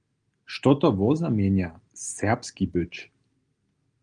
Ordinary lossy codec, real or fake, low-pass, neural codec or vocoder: Opus, 24 kbps; real; 9.9 kHz; none